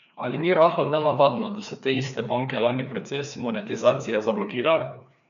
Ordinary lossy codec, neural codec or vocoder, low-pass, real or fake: none; codec, 16 kHz, 2 kbps, FreqCodec, larger model; 7.2 kHz; fake